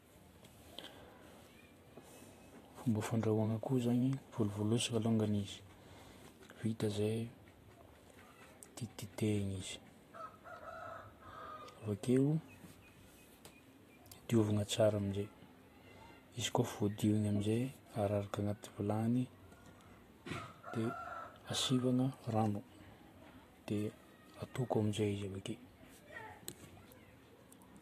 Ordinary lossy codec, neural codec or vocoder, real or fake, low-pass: AAC, 48 kbps; vocoder, 44.1 kHz, 128 mel bands every 512 samples, BigVGAN v2; fake; 14.4 kHz